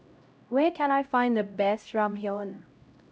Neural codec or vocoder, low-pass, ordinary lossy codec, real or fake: codec, 16 kHz, 0.5 kbps, X-Codec, HuBERT features, trained on LibriSpeech; none; none; fake